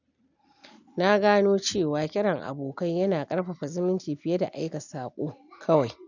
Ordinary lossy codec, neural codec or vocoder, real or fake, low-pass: none; none; real; 7.2 kHz